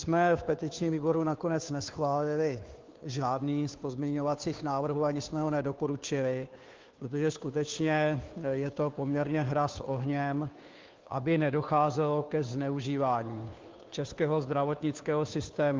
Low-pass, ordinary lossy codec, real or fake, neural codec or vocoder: 7.2 kHz; Opus, 24 kbps; fake; codec, 16 kHz, 2 kbps, FunCodec, trained on Chinese and English, 25 frames a second